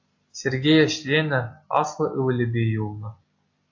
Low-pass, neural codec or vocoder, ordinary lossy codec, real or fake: 7.2 kHz; none; AAC, 48 kbps; real